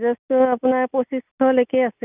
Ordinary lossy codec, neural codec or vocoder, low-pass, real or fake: none; none; 3.6 kHz; real